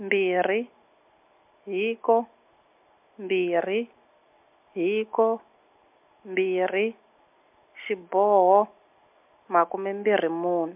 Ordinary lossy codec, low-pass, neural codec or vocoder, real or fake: MP3, 32 kbps; 3.6 kHz; none; real